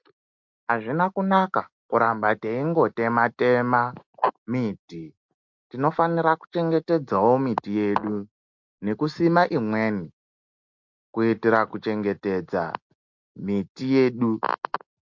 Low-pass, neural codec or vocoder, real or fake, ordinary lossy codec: 7.2 kHz; none; real; MP3, 48 kbps